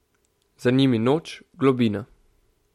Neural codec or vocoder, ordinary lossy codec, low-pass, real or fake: none; MP3, 64 kbps; 19.8 kHz; real